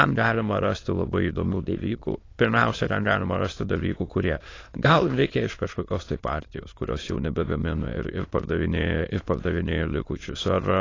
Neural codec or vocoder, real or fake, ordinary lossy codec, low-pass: autoencoder, 22.05 kHz, a latent of 192 numbers a frame, VITS, trained on many speakers; fake; AAC, 32 kbps; 7.2 kHz